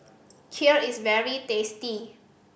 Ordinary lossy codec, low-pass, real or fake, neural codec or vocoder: none; none; real; none